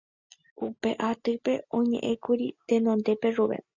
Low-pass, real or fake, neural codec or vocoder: 7.2 kHz; real; none